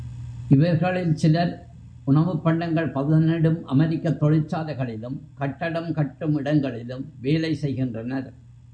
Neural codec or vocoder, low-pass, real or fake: none; 9.9 kHz; real